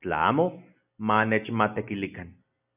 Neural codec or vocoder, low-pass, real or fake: none; 3.6 kHz; real